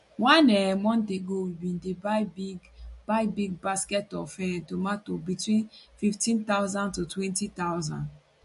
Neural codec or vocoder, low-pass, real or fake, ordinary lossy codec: none; 14.4 kHz; real; MP3, 48 kbps